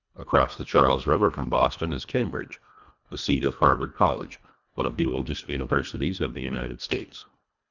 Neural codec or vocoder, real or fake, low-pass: codec, 24 kHz, 1.5 kbps, HILCodec; fake; 7.2 kHz